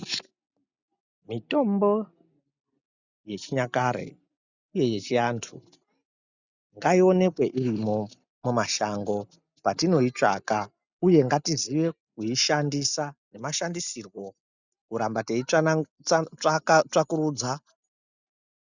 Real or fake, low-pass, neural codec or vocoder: real; 7.2 kHz; none